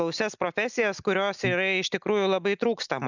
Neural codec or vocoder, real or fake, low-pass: none; real; 7.2 kHz